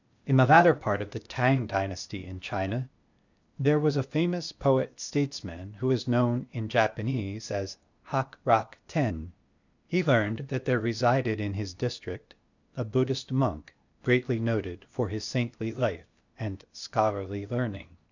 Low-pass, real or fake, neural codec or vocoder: 7.2 kHz; fake; codec, 16 kHz, 0.8 kbps, ZipCodec